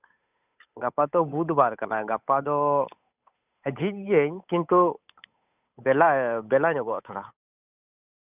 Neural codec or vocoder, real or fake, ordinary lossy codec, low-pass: codec, 16 kHz, 8 kbps, FunCodec, trained on Chinese and English, 25 frames a second; fake; none; 3.6 kHz